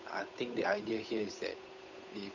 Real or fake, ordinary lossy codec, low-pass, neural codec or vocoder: fake; none; 7.2 kHz; codec, 16 kHz, 8 kbps, FunCodec, trained on Chinese and English, 25 frames a second